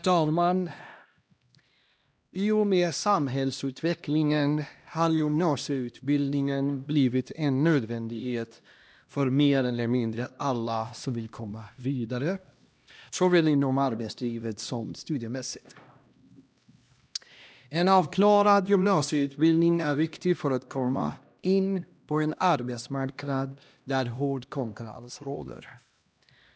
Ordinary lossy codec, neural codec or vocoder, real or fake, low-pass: none; codec, 16 kHz, 1 kbps, X-Codec, HuBERT features, trained on LibriSpeech; fake; none